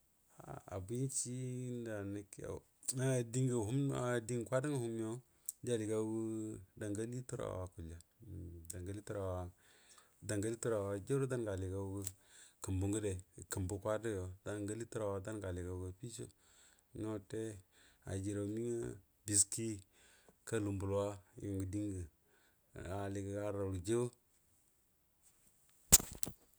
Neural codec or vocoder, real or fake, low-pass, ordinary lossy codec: none; real; none; none